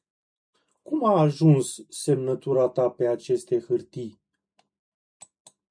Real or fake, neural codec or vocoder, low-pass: real; none; 9.9 kHz